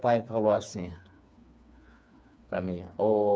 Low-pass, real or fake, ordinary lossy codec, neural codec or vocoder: none; fake; none; codec, 16 kHz, 4 kbps, FreqCodec, smaller model